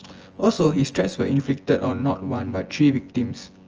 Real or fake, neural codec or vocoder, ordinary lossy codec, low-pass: fake; vocoder, 24 kHz, 100 mel bands, Vocos; Opus, 24 kbps; 7.2 kHz